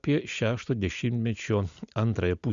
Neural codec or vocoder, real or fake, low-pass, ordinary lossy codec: none; real; 7.2 kHz; Opus, 64 kbps